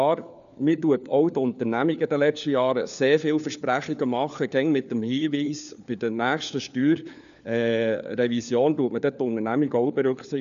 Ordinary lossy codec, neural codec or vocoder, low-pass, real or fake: none; codec, 16 kHz, 4 kbps, FunCodec, trained on LibriTTS, 50 frames a second; 7.2 kHz; fake